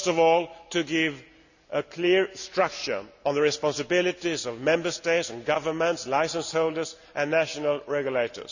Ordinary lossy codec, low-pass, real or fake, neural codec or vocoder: none; 7.2 kHz; real; none